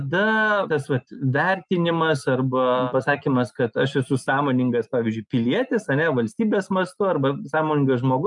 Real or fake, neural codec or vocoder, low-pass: real; none; 10.8 kHz